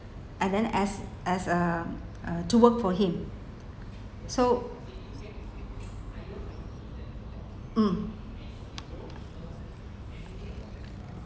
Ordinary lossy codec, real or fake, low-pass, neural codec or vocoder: none; real; none; none